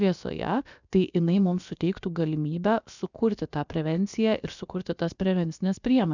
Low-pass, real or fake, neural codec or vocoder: 7.2 kHz; fake; codec, 16 kHz, about 1 kbps, DyCAST, with the encoder's durations